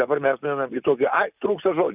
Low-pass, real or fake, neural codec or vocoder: 3.6 kHz; real; none